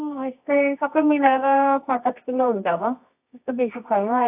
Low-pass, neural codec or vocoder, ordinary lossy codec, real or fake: 3.6 kHz; codec, 24 kHz, 0.9 kbps, WavTokenizer, medium music audio release; AAC, 32 kbps; fake